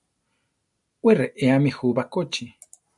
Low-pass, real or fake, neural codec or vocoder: 10.8 kHz; real; none